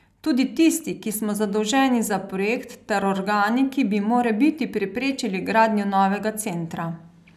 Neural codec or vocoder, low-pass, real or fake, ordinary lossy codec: none; 14.4 kHz; real; none